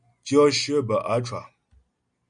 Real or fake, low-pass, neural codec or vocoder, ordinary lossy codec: real; 9.9 kHz; none; MP3, 96 kbps